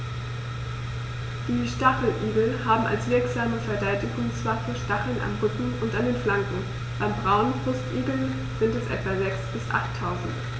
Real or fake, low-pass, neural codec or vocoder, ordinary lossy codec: real; none; none; none